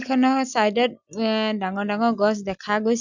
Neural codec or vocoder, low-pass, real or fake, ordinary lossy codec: none; 7.2 kHz; real; none